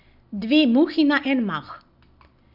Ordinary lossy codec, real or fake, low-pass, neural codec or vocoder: none; real; 5.4 kHz; none